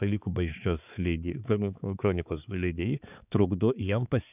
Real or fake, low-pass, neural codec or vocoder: fake; 3.6 kHz; codec, 16 kHz, 4 kbps, X-Codec, HuBERT features, trained on balanced general audio